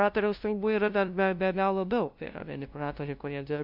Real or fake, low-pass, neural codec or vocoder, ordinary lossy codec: fake; 5.4 kHz; codec, 16 kHz, 0.5 kbps, FunCodec, trained on LibriTTS, 25 frames a second; MP3, 48 kbps